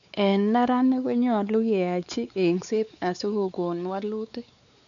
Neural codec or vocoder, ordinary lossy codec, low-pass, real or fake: codec, 16 kHz, 4 kbps, X-Codec, WavLM features, trained on Multilingual LibriSpeech; none; 7.2 kHz; fake